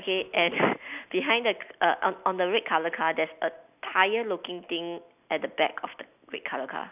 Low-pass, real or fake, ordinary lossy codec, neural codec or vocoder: 3.6 kHz; real; none; none